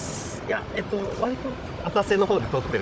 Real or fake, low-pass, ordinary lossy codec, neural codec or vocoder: fake; none; none; codec, 16 kHz, 16 kbps, FunCodec, trained on Chinese and English, 50 frames a second